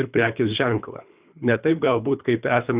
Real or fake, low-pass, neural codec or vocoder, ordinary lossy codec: fake; 3.6 kHz; codec, 24 kHz, 3 kbps, HILCodec; Opus, 64 kbps